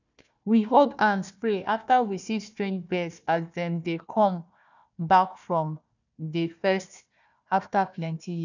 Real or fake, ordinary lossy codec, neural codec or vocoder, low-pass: fake; none; codec, 16 kHz, 1 kbps, FunCodec, trained on Chinese and English, 50 frames a second; 7.2 kHz